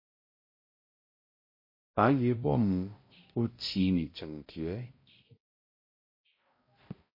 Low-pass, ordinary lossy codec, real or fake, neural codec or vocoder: 5.4 kHz; MP3, 24 kbps; fake; codec, 16 kHz, 0.5 kbps, X-Codec, HuBERT features, trained on balanced general audio